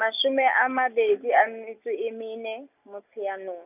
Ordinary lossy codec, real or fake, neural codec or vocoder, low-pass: none; real; none; 3.6 kHz